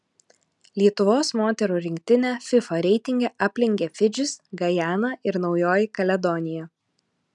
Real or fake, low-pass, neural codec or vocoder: real; 10.8 kHz; none